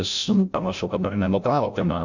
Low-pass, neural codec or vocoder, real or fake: 7.2 kHz; codec, 16 kHz, 0.5 kbps, FreqCodec, larger model; fake